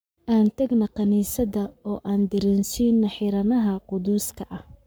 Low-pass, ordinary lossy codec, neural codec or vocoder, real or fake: none; none; codec, 44.1 kHz, 7.8 kbps, Pupu-Codec; fake